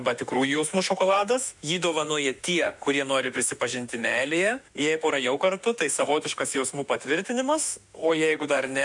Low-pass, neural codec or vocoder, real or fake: 10.8 kHz; autoencoder, 48 kHz, 32 numbers a frame, DAC-VAE, trained on Japanese speech; fake